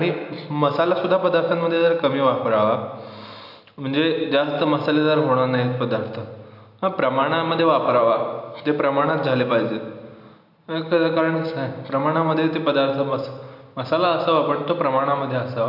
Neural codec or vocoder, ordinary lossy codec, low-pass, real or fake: none; none; 5.4 kHz; real